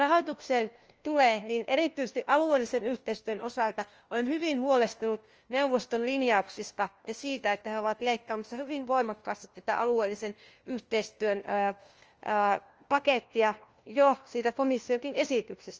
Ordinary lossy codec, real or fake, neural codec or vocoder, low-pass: Opus, 24 kbps; fake; codec, 16 kHz, 1 kbps, FunCodec, trained on LibriTTS, 50 frames a second; 7.2 kHz